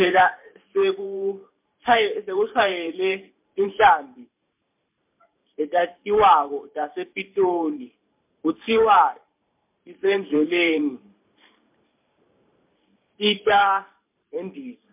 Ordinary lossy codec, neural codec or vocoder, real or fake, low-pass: MP3, 32 kbps; vocoder, 44.1 kHz, 128 mel bands every 512 samples, BigVGAN v2; fake; 3.6 kHz